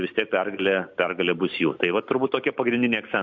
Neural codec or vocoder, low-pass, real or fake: none; 7.2 kHz; real